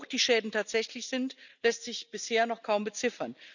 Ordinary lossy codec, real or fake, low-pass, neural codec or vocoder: none; real; 7.2 kHz; none